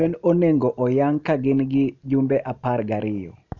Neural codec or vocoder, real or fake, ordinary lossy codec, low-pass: none; real; MP3, 64 kbps; 7.2 kHz